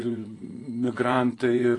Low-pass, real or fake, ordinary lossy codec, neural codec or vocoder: 10.8 kHz; fake; AAC, 32 kbps; vocoder, 24 kHz, 100 mel bands, Vocos